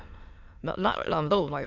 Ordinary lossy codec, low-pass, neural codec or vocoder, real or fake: none; 7.2 kHz; autoencoder, 22.05 kHz, a latent of 192 numbers a frame, VITS, trained on many speakers; fake